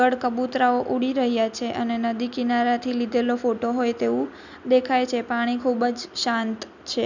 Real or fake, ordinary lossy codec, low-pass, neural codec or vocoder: real; none; 7.2 kHz; none